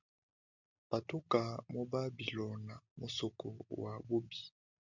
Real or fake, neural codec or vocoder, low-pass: real; none; 7.2 kHz